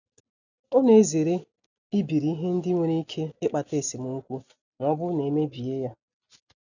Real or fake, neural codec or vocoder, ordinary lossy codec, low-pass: real; none; none; 7.2 kHz